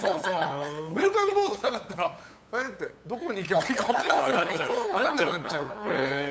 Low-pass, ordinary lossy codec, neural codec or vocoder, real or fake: none; none; codec, 16 kHz, 8 kbps, FunCodec, trained on LibriTTS, 25 frames a second; fake